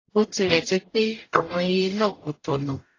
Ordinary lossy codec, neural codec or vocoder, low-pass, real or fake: AAC, 32 kbps; codec, 44.1 kHz, 0.9 kbps, DAC; 7.2 kHz; fake